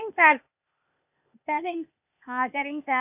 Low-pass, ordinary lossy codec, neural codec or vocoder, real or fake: 3.6 kHz; none; codec, 16 kHz, 0.8 kbps, ZipCodec; fake